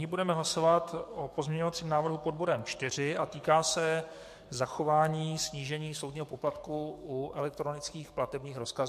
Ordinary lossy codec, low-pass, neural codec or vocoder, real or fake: MP3, 64 kbps; 14.4 kHz; codec, 44.1 kHz, 7.8 kbps, DAC; fake